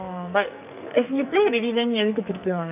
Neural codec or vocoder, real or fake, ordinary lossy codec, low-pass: codec, 44.1 kHz, 2.6 kbps, SNAC; fake; none; 3.6 kHz